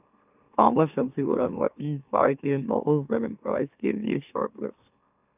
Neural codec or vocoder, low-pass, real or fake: autoencoder, 44.1 kHz, a latent of 192 numbers a frame, MeloTTS; 3.6 kHz; fake